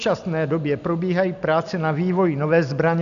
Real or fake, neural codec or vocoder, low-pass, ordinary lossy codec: real; none; 7.2 kHz; AAC, 96 kbps